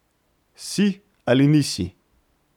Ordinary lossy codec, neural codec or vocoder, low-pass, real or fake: none; none; 19.8 kHz; real